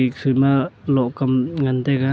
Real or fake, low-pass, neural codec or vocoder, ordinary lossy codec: real; none; none; none